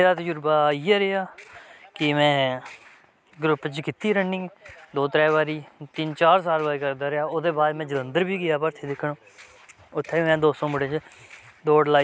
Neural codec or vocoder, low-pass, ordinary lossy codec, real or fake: none; none; none; real